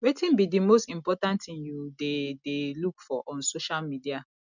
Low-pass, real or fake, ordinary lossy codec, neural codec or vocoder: 7.2 kHz; real; none; none